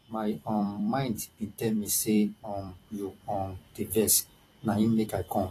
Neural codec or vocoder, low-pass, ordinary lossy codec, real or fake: vocoder, 44.1 kHz, 128 mel bands every 256 samples, BigVGAN v2; 14.4 kHz; AAC, 48 kbps; fake